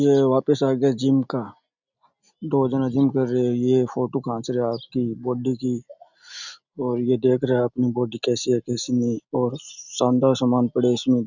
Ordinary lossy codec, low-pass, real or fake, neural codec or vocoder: none; 7.2 kHz; real; none